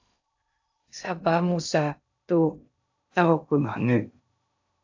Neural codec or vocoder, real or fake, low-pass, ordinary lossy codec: codec, 16 kHz in and 24 kHz out, 0.6 kbps, FocalCodec, streaming, 2048 codes; fake; 7.2 kHz; AAC, 48 kbps